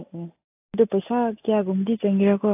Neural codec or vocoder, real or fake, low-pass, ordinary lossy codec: none; real; 3.6 kHz; AAC, 32 kbps